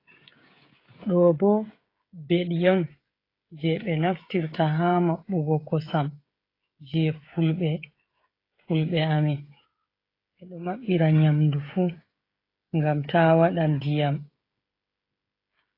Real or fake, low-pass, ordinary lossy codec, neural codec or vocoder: fake; 5.4 kHz; AAC, 24 kbps; codec, 16 kHz, 16 kbps, FreqCodec, smaller model